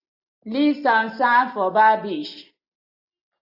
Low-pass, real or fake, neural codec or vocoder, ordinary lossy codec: 5.4 kHz; real; none; AAC, 48 kbps